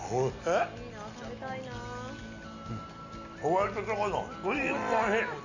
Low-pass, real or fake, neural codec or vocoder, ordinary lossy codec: 7.2 kHz; real; none; none